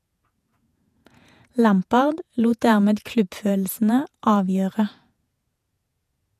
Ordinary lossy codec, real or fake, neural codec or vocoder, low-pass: none; fake; vocoder, 48 kHz, 128 mel bands, Vocos; 14.4 kHz